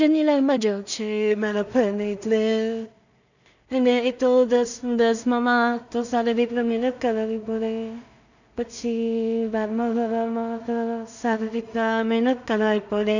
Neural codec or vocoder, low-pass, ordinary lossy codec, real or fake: codec, 16 kHz in and 24 kHz out, 0.4 kbps, LongCat-Audio-Codec, two codebook decoder; 7.2 kHz; none; fake